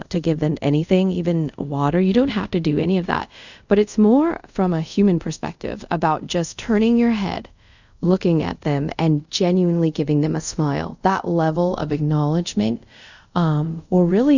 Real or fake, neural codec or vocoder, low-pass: fake; codec, 24 kHz, 0.5 kbps, DualCodec; 7.2 kHz